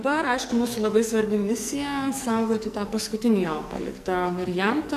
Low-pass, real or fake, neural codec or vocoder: 14.4 kHz; fake; codec, 44.1 kHz, 2.6 kbps, SNAC